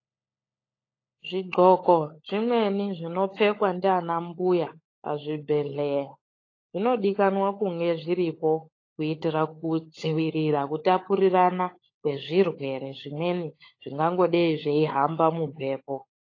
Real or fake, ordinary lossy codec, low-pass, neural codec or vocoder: fake; AAC, 32 kbps; 7.2 kHz; codec, 16 kHz, 16 kbps, FunCodec, trained on LibriTTS, 50 frames a second